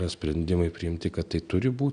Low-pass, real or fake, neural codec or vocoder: 9.9 kHz; real; none